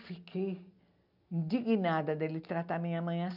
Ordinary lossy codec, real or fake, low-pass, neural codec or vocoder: none; real; 5.4 kHz; none